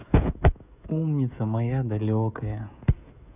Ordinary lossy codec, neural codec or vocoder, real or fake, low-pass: none; codec, 16 kHz, 16 kbps, FreqCodec, smaller model; fake; 3.6 kHz